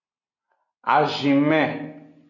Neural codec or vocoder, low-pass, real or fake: none; 7.2 kHz; real